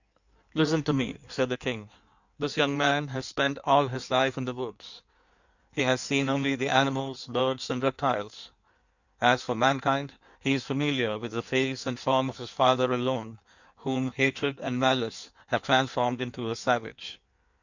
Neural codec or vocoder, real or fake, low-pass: codec, 16 kHz in and 24 kHz out, 1.1 kbps, FireRedTTS-2 codec; fake; 7.2 kHz